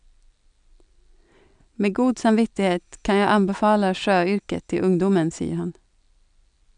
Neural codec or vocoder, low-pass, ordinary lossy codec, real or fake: none; 9.9 kHz; none; real